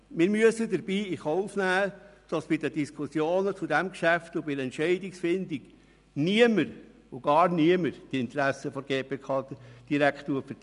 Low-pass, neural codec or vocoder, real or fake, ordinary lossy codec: 10.8 kHz; none; real; none